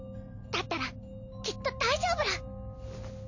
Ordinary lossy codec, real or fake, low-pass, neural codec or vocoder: none; real; 7.2 kHz; none